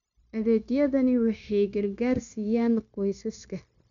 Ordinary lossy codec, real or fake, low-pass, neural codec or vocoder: none; fake; 7.2 kHz; codec, 16 kHz, 0.9 kbps, LongCat-Audio-Codec